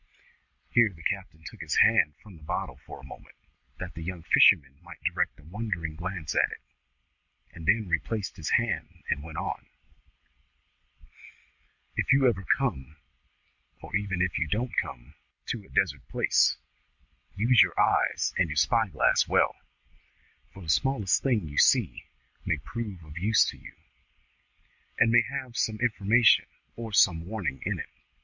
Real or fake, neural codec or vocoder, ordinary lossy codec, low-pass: real; none; Opus, 64 kbps; 7.2 kHz